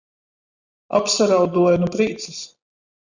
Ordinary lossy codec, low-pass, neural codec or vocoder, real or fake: Opus, 64 kbps; 7.2 kHz; none; real